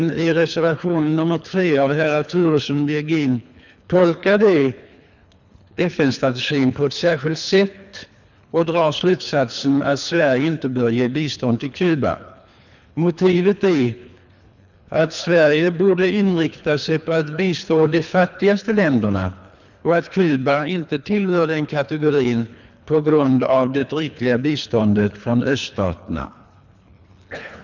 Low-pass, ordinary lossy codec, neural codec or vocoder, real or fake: 7.2 kHz; none; codec, 24 kHz, 3 kbps, HILCodec; fake